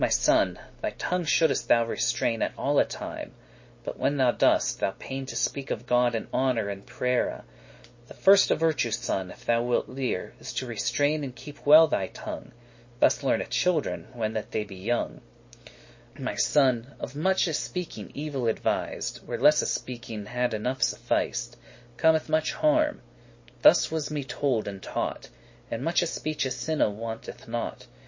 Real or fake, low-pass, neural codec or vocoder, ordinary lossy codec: real; 7.2 kHz; none; MP3, 32 kbps